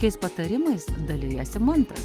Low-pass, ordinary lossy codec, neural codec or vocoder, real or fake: 14.4 kHz; Opus, 24 kbps; none; real